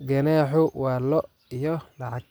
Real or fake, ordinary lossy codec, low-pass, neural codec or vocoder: real; none; none; none